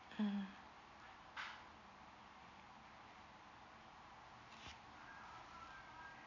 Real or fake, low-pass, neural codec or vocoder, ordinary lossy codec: real; 7.2 kHz; none; none